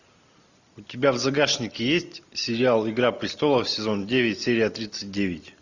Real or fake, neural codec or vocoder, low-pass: real; none; 7.2 kHz